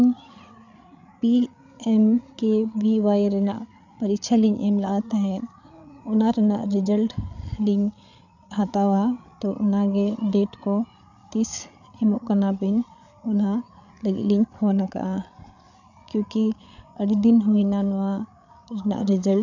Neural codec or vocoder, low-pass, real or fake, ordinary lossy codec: codec, 16 kHz, 8 kbps, FreqCodec, larger model; 7.2 kHz; fake; none